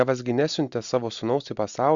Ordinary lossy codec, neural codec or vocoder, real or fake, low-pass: Opus, 64 kbps; none; real; 7.2 kHz